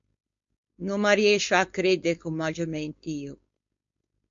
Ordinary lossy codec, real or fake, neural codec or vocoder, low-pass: MP3, 48 kbps; fake; codec, 16 kHz, 4.8 kbps, FACodec; 7.2 kHz